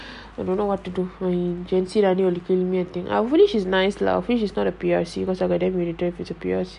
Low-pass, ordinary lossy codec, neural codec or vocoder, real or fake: 10.8 kHz; none; none; real